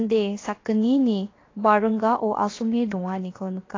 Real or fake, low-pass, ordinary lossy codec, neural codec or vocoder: fake; 7.2 kHz; AAC, 32 kbps; codec, 16 kHz, 0.7 kbps, FocalCodec